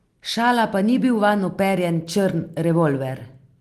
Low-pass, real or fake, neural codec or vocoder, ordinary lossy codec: 14.4 kHz; real; none; Opus, 24 kbps